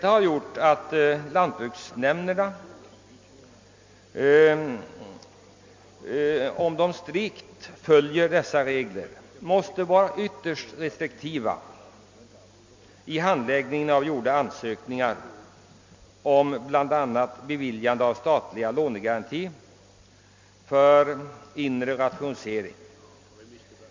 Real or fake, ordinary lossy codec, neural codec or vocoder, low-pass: real; MP3, 48 kbps; none; 7.2 kHz